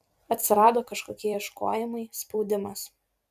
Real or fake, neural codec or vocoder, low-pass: fake; vocoder, 44.1 kHz, 128 mel bands every 256 samples, BigVGAN v2; 14.4 kHz